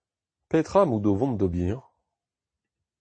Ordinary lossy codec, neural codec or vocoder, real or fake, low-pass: MP3, 32 kbps; none; real; 9.9 kHz